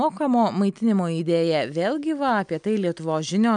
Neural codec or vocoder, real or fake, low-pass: none; real; 9.9 kHz